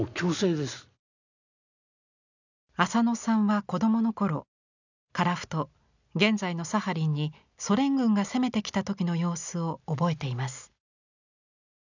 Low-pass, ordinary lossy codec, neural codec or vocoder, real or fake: 7.2 kHz; none; none; real